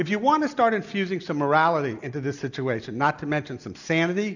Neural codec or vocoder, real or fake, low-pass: none; real; 7.2 kHz